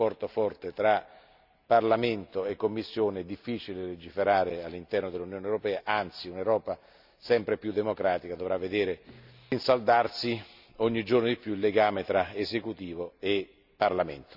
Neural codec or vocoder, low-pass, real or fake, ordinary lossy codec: none; 5.4 kHz; real; none